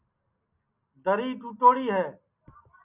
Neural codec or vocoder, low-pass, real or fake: none; 3.6 kHz; real